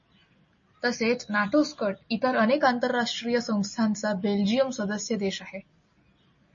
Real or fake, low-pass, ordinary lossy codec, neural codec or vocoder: real; 7.2 kHz; MP3, 32 kbps; none